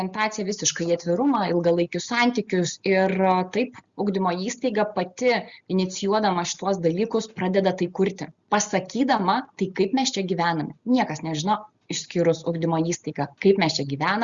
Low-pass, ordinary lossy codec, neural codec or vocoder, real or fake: 7.2 kHz; Opus, 64 kbps; none; real